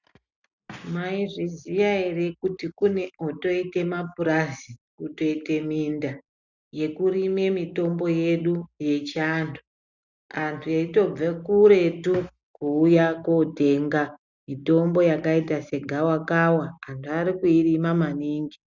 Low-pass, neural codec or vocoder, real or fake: 7.2 kHz; none; real